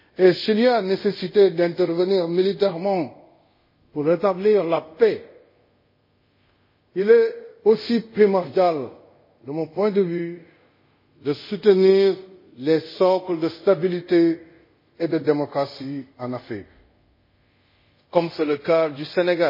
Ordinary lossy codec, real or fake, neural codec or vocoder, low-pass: MP3, 24 kbps; fake; codec, 24 kHz, 0.5 kbps, DualCodec; 5.4 kHz